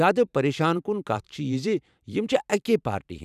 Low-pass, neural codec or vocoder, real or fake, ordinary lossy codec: 14.4 kHz; vocoder, 44.1 kHz, 128 mel bands every 512 samples, BigVGAN v2; fake; none